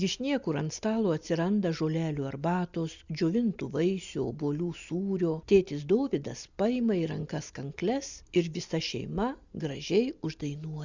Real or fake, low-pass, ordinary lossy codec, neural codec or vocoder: real; 7.2 kHz; Opus, 64 kbps; none